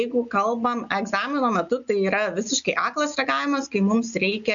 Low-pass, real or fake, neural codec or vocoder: 7.2 kHz; real; none